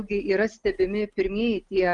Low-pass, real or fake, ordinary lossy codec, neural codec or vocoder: 10.8 kHz; real; Opus, 32 kbps; none